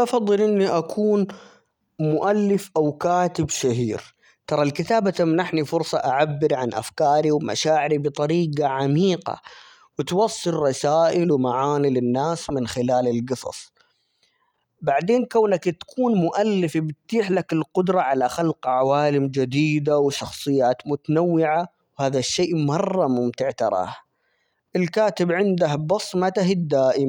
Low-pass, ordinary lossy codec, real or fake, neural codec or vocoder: 19.8 kHz; none; real; none